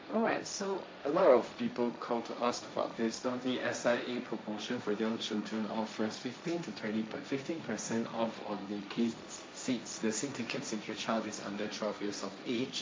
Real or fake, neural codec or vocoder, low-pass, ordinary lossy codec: fake; codec, 16 kHz, 1.1 kbps, Voila-Tokenizer; none; none